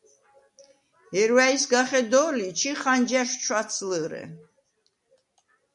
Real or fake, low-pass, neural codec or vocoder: real; 10.8 kHz; none